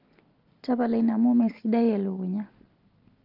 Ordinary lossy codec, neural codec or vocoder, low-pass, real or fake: Opus, 24 kbps; none; 5.4 kHz; real